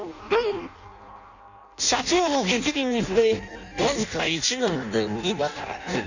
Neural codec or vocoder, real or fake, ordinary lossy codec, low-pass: codec, 16 kHz in and 24 kHz out, 0.6 kbps, FireRedTTS-2 codec; fake; none; 7.2 kHz